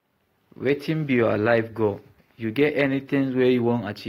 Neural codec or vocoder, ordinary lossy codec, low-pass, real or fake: none; AAC, 48 kbps; 19.8 kHz; real